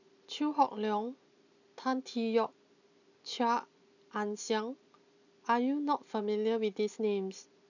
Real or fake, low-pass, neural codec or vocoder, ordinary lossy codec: real; 7.2 kHz; none; none